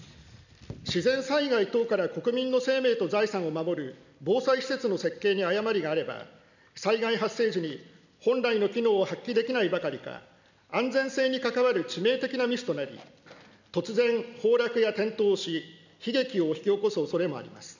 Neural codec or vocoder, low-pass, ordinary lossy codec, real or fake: none; 7.2 kHz; none; real